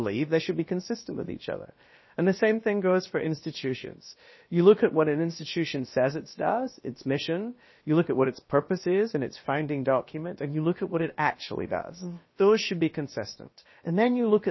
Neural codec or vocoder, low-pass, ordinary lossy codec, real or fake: codec, 16 kHz, about 1 kbps, DyCAST, with the encoder's durations; 7.2 kHz; MP3, 24 kbps; fake